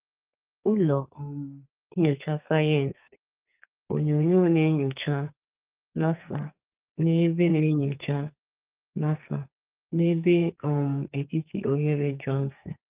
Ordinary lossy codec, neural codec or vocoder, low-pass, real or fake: Opus, 24 kbps; codec, 44.1 kHz, 2.6 kbps, SNAC; 3.6 kHz; fake